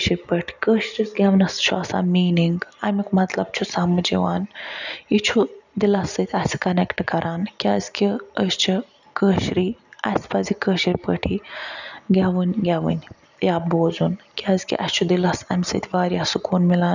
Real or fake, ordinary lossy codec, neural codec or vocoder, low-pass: real; none; none; 7.2 kHz